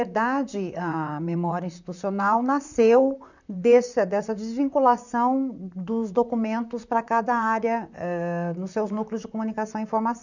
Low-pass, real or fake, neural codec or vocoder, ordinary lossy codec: 7.2 kHz; fake; vocoder, 44.1 kHz, 128 mel bands, Pupu-Vocoder; none